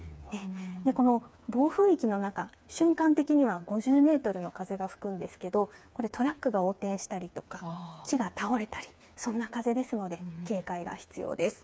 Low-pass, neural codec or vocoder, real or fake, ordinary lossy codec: none; codec, 16 kHz, 4 kbps, FreqCodec, smaller model; fake; none